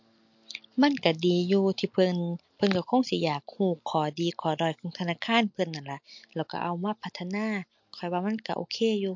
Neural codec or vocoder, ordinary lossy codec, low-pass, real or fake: none; MP3, 48 kbps; 7.2 kHz; real